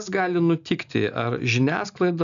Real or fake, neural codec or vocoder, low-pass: real; none; 7.2 kHz